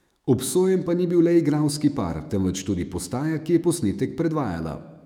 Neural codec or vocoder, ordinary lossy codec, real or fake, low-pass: autoencoder, 48 kHz, 128 numbers a frame, DAC-VAE, trained on Japanese speech; none; fake; 19.8 kHz